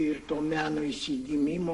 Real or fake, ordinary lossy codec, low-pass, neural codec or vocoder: fake; MP3, 48 kbps; 14.4 kHz; codec, 44.1 kHz, 7.8 kbps, Pupu-Codec